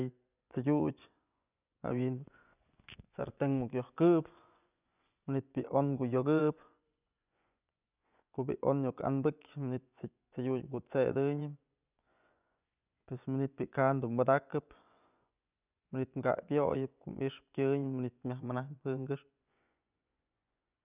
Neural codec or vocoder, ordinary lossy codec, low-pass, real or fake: vocoder, 24 kHz, 100 mel bands, Vocos; none; 3.6 kHz; fake